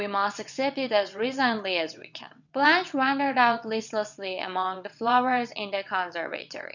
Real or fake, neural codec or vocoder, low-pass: fake; vocoder, 22.05 kHz, 80 mel bands, WaveNeXt; 7.2 kHz